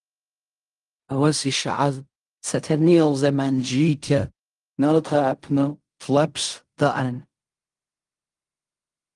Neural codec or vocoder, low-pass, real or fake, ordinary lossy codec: codec, 16 kHz in and 24 kHz out, 0.4 kbps, LongCat-Audio-Codec, fine tuned four codebook decoder; 10.8 kHz; fake; Opus, 32 kbps